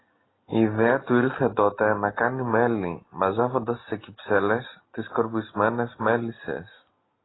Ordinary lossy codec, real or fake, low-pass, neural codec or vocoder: AAC, 16 kbps; real; 7.2 kHz; none